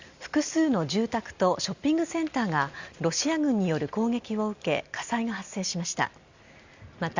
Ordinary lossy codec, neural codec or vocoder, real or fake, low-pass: Opus, 64 kbps; none; real; 7.2 kHz